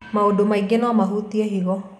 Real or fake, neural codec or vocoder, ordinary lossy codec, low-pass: real; none; none; 14.4 kHz